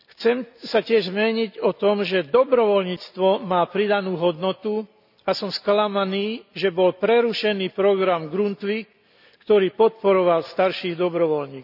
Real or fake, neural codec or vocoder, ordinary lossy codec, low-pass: real; none; none; 5.4 kHz